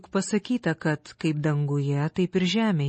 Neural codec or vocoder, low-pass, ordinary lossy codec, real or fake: none; 10.8 kHz; MP3, 32 kbps; real